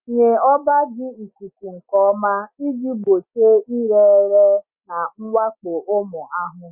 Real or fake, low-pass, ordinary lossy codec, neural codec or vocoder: real; 3.6 kHz; Opus, 64 kbps; none